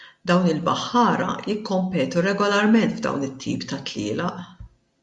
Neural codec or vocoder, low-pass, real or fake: none; 10.8 kHz; real